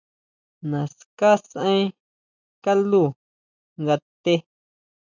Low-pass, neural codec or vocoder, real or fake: 7.2 kHz; none; real